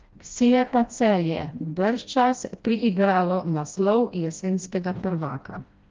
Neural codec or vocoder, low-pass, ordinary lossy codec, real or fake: codec, 16 kHz, 1 kbps, FreqCodec, smaller model; 7.2 kHz; Opus, 32 kbps; fake